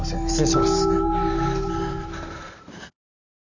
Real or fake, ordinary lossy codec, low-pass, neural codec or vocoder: real; none; 7.2 kHz; none